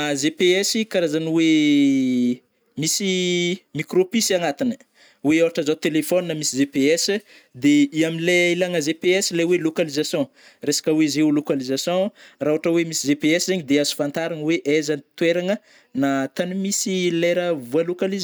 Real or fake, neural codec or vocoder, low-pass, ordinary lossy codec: real; none; none; none